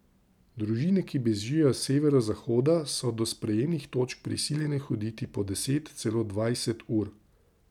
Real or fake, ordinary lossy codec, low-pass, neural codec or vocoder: real; none; 19.8 kHz; none